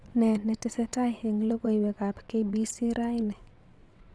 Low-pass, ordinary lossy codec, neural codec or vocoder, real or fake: none; none; vocoder, 22.05 kHz, 80 mel bands, WaveNeXt; fake